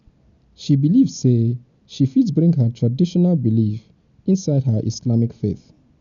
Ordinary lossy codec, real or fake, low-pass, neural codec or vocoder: none; real; 7.2 kHz; none